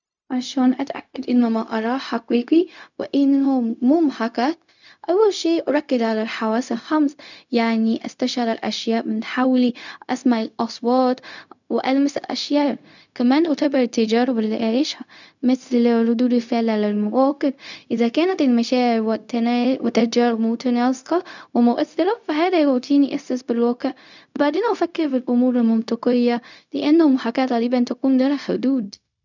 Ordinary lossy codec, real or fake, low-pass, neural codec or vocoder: none; fake; 7.2 kHz; codec, 16 kHz, 0.4 kbps, LongCat-Audio-Codec